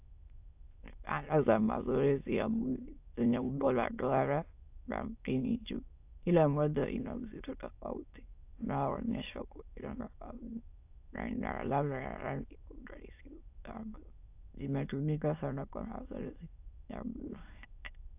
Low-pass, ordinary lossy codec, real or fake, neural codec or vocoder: 3.6 kHz; AAC, 32 kbps; fake; autoencoder, 22.05 kHz, a latent of 192 numbers a frame, VITS, trained on many speakers